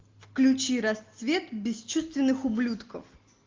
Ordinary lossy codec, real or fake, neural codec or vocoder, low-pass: Opus, 32 kbps; real; none; 7.2 kHz